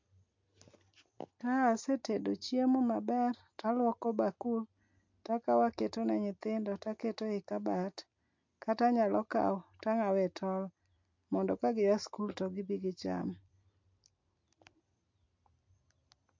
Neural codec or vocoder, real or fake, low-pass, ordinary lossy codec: none; real; 7.2 kHz; MP3, 48 kbps